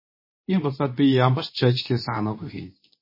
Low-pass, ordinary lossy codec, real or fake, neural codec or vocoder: 5.4 kHz; MP3, 24 kbps; fake; codec, 24 kHz, 0.9 kbps, WavTokenizer, medium speech release version 2